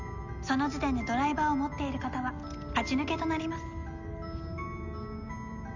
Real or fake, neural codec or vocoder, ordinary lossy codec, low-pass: real; none; none; 7.2 kHz